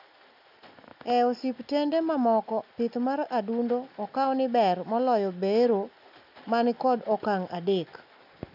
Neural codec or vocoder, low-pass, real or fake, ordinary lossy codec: none; 5.4 kHz; real; none